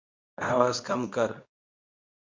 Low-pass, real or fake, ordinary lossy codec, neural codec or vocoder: 7.2 kHz; fake; MP3, 48 kbps; codec, 24 kHz, 0.9 kbps, WavTokenizer, medium speech release version 2